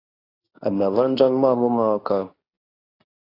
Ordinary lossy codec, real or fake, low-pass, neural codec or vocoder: AAC, 24 kbps; fake; 5.4 kHz; codec, 24 kHz, 0.9 kbps, WavTokenizer, medium speech release version 2